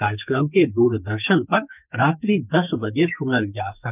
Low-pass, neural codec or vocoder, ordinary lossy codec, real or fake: 3.6 kHz; codec, 44.1 kHz, 2.6 kbps, SNAC; none; fake